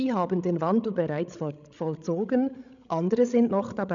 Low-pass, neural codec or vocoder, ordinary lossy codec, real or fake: 7.2 kHz; codec, 16 kHz, 16 kbps, FreqCodec, larger model; none; fake